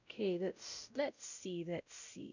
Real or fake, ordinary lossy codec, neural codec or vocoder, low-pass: fake; none; codec, 16 kHz, 0.5 kbps, X-Codec, WavLM features, trained on Multilingual LibriSpeech; 7.2 kHz